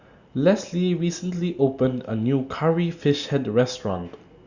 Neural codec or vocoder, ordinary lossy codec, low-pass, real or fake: none; Opus, 64 kbps; 7.2 kHz; real